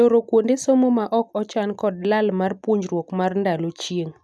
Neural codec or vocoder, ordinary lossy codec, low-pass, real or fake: none; none; none; real